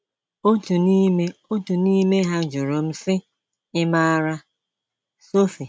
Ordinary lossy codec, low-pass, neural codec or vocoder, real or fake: none; none; none; real